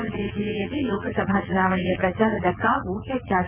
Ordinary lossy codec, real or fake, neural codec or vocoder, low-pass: none; fake; vocoder, 22.05 kHz, 80 mel bands, WaveNeXt; 3.6 kHz